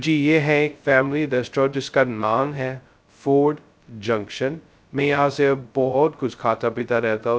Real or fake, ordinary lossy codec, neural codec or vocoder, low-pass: fake; none; codec, 16 kHz, 0.2 kbps, FocalCodec; none